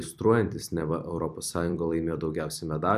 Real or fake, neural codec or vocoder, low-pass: fake; vocoder, 44.1 kHz, 128 mel bands every 256 samples, BigVGAN v2; 14.4 kHz